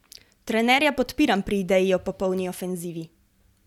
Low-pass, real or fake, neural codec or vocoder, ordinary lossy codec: 19.8 kHz; real; none; none